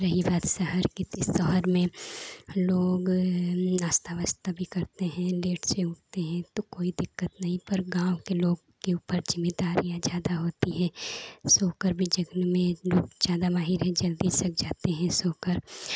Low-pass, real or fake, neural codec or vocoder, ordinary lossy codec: none; real; none; none